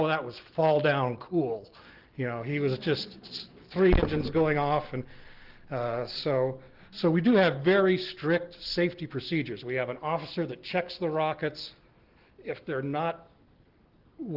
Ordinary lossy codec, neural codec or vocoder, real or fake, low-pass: Opus, 16 kbps; none; real; 5.4 kHz